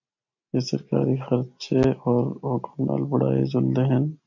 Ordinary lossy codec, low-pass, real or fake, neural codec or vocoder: MP3, 48 kbps; 7.2 kHz; real; none